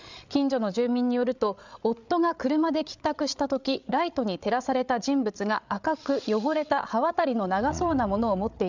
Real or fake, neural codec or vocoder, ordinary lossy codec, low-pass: fake; codec, 16 kHz, 8 kbps, FreqCodec, larger model; none; 7.2 kHz